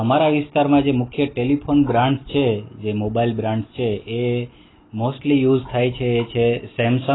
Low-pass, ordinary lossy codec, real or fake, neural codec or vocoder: 7.2 kHz; AAC, 16 kbps; real; none